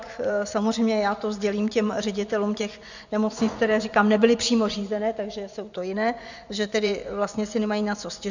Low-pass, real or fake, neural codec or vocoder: 7.2 kHz; fake; vocoder, 24 kHz, 100 mel bands, Vocos